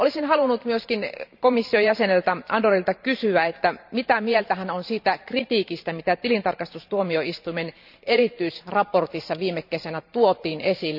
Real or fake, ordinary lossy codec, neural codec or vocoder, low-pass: fake; none; vocoder, 44.1 kHz, 128 mel bands every 512 samples, BigVGAN v2; 5.4 kHz